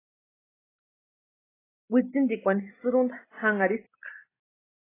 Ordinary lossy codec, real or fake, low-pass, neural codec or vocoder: AAC, 16 kbps; real; 3.6 kHz; none